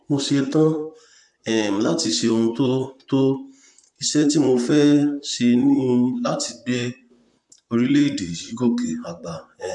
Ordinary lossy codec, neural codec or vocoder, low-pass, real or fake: MP3, 96 kbps; vocoder, 44.1 kHz, 128 mel bands, Pupu-Vocoder; 10.8 kHz; fake